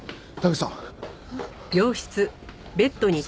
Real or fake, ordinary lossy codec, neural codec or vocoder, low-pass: real; none; none; none